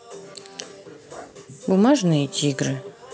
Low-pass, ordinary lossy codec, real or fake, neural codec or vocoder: none; none; real; none